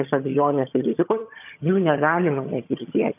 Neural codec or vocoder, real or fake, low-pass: vocoder, 22.05 kHz, 80 mel bands, HiFi-GAN; fake; 3.6 kHz